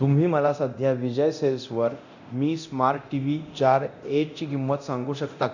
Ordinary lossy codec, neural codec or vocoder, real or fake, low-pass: none; codec, 24 kHz, 0.9 kbps, DualCodec; fake; 7.2 kHz